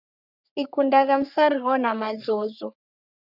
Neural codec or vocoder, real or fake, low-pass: codec, 44.1 kHz, 3.4 kbps, Pupu-Codec; fake; 5.4 kHz